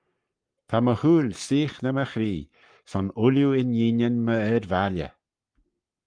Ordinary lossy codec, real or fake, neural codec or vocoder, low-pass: Opus, 32 kbps; fake; codec, 44.1 kHz, 7.8 kbps, Pupu-Codec; 9.9 kHz